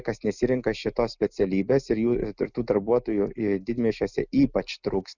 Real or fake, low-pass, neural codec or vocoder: real; 7.2 kHz; none